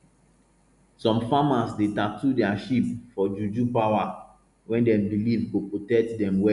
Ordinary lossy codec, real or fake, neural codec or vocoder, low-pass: none; real; none; 10.8 kHz